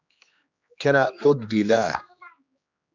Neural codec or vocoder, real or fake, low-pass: codec, 16 kHz, 2 kbps, X-Codec, HuBERT features, trained on general audio; fake; 7.2 kHz